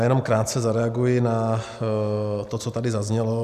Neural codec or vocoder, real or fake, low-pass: none; real; 14.4 kHz